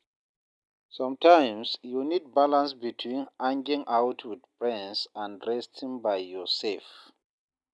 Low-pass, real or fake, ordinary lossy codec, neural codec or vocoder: none; real; none; none